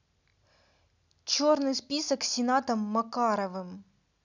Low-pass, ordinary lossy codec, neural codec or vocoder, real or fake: 7.2 kHz; none; none; real